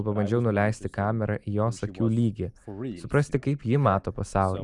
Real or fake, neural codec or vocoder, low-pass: real; none; 10.8 kHz